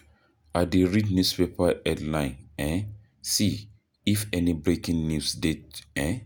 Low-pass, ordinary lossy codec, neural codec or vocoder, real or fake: none; none; none; real